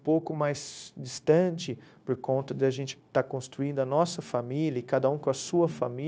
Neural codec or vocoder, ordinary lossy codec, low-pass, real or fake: codec, 16 kHz, 0.9 kbps, LongCat-Audio-Codec; none; none; fake